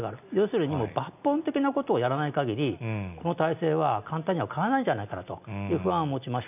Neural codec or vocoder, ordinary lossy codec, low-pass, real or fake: none; none; 3.6 kHz; real